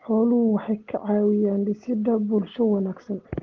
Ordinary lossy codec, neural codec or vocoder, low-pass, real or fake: Opus, 16 kbps; none; 7.2 kHz; real